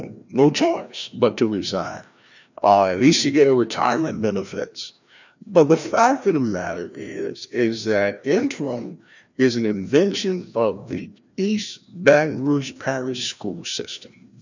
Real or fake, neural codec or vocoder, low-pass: fake; codec, 16 kHz, 1 kbps, FreqCodec, larger model; 7.2 kHz